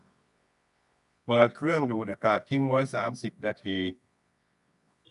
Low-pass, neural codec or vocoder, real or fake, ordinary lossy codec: 10.8 kHz; codec, 24 kHz, 0.9 kbps, WavTokenizer, medium music audio release; fake; none